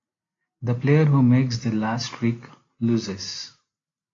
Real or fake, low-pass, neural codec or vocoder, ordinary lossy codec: real; 7.2 kHz; none; AAC, 32 kbps